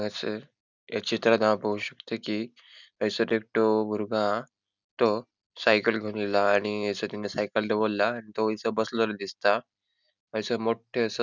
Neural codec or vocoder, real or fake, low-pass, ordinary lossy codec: none; real; 7.2 kHz; none